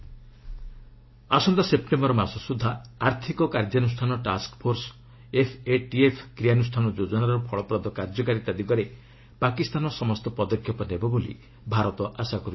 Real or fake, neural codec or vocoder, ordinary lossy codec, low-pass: real; none; MP3, 24 kbps; 7.2 kHz